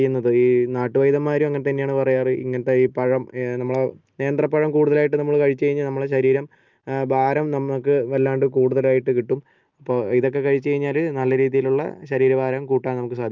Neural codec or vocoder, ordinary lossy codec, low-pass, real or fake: none; Opus, 24 kbps; 7.2 kHz; real